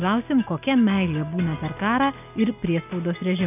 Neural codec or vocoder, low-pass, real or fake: none; 3.6 kHz; real